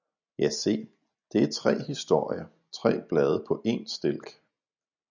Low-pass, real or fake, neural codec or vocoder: 7.2 kHz; real; none